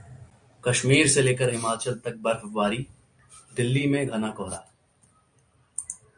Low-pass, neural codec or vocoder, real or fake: 9.9 kHz; none; real